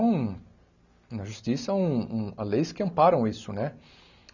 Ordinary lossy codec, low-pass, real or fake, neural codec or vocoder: none; 7.2 kHz; real; none